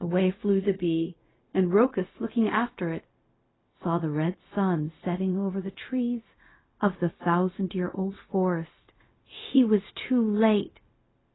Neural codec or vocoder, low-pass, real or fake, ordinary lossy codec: codec, 16 kHz, 0.4 kbps, LongCat-Audio-Codec; 7.2 kHz; fake; AAC, 16 kbps